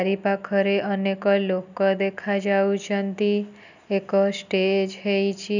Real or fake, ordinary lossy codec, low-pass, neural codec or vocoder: real; none; 7.2 kHz; none